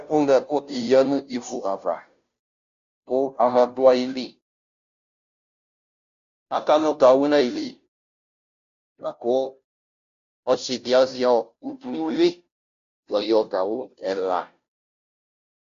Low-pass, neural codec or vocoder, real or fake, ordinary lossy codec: 7.2 kHz; codec, 16 kHz, 0.5 kbps, FunCodec, trained on Chinese and English, 25 frames a second; fake; MP3, 64 kbps